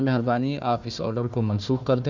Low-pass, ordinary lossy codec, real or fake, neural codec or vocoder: 7.2 kHz; Opus, 64 kbps; fake; codec, 16 kHz, 1 kbps, FunCodec, trained on Chinese and English, 50 frames a second